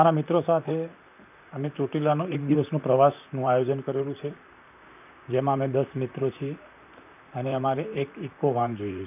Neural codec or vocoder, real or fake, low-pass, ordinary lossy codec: vocoder, 44.1 kHz, 128 mel bands, Pupu-Vocoder; fake; 3.6 kHz; none